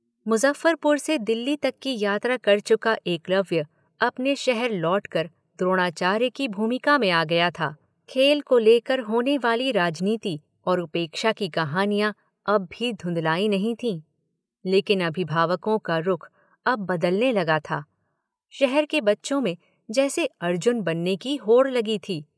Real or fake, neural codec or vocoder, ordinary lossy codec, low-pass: real; none; none; none